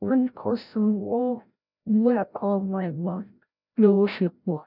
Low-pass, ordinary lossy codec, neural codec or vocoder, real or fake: 5.4 kHz; none; codec, 16 kHz, 0.5 kbps, FreqCodec, larger model; fake